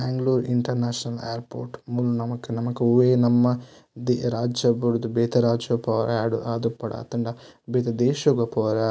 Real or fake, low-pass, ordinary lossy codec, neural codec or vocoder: real; none; none; none